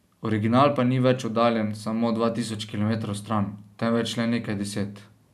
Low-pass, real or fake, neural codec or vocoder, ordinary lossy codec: 14.4 kHz; real; none; none